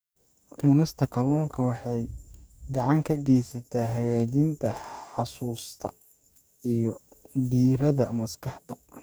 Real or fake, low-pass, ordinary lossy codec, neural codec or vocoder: fake; none; none; codec, 44.1 kHz, 2.6 kbps, DAC